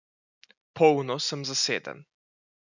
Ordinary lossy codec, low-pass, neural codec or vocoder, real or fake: none; 7.2 kHz; none; real